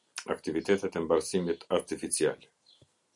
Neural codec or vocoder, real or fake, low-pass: none; real; 10.8 kHz